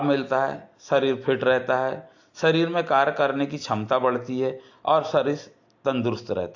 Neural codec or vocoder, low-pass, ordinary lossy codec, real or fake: none; 7.2 kHz; AAC, 48 kbps; real